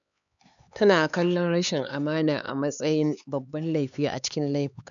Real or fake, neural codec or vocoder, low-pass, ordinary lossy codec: fake; codec, 16 kHz, 4 kbps, X-Codec, HuBERT features, trained on LibriSpeech; 7.2 kHz; none